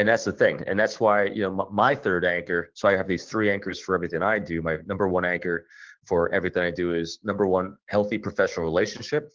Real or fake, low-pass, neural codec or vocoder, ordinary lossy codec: fake; 7.2 kHz; codec, 16 kHz, 6 kbps, DAC; Opus, 16 kbps